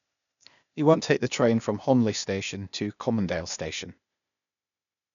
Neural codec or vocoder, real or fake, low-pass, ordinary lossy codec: codec, 16 kHz, 0.8 kbps, ZipCodec; fake; 7.2 kHz; none